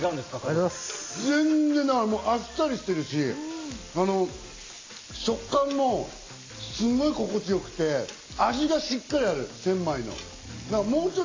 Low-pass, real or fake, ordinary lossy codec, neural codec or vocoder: 7.2 kHz; real; AAC, 32 kbps; none